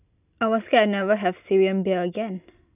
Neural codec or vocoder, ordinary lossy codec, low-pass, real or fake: none; none; 3.6 kHz; real